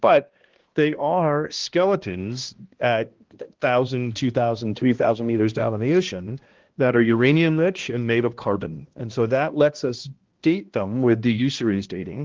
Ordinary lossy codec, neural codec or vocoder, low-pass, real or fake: Opus, 16 kbps; codec, 16 kHz, 1 kbps, X-Codec, HuBERT features, trained on balanced general audio; 7.2 kHz; fake